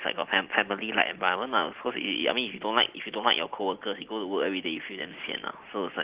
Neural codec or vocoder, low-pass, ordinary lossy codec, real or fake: none; 3.6 kHz; Opus, 16 kbps; real